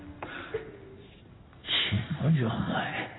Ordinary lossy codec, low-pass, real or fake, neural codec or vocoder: AAC, 16 kbps; 7.2 kHz; fake; codec, 16 kHz in and 24 kHz out, 1 kbps, XY-Tokenizer